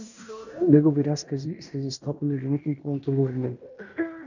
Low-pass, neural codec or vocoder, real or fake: 7.2 kHz; codec, 16 kHz in and 24 kHz out, 0.9 kbps, LongCat-Audio-Codec, fine tuned four codebook decoder; fake